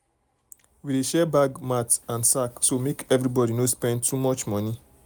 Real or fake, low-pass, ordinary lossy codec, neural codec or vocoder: real; none; none; none